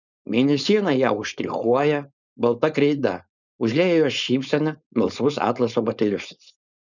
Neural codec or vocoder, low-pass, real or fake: codec, 16 kHz, 4.8 kbps, FACodec; 7.2 kHz; fake